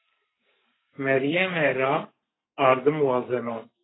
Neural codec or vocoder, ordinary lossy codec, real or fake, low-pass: codec, 44.1 kHz, 2.6 kbps, SNAC; AAC, 16 kbps; fake; 7.2 kHz